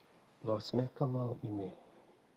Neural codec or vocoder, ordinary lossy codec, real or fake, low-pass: codec, 32 kHz, 1.9 kbps, SNAC; Opus, 32 kbps; fake; 14.4 kHz